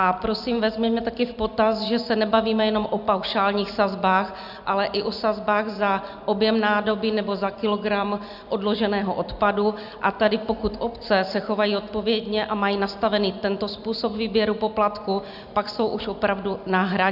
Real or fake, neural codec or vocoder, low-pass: real; none; 5.4 kHz